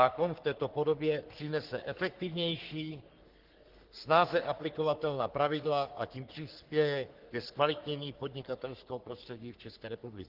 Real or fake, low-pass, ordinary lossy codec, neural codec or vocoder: fake; 5.4 kHz; Opus, 16 kbps; codec, 44.1 kHz, 3.4 kbps, Pupu-Codec